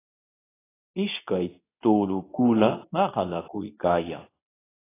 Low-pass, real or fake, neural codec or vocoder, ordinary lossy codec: 3.6 kHz; fake; codec, 24 kHz, 0.9 kbps, WavTokenizer, medium speech release version 2; AAC, 16 kbps